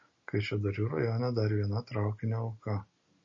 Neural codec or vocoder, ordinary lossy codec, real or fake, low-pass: none; MP3, 32 kbps; real; 7.2 kHz